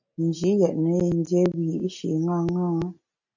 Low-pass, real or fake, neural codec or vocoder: 7.2 kHz; real; none